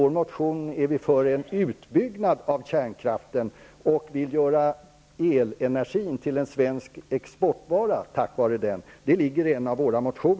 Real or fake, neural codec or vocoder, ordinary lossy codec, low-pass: real; none; none; none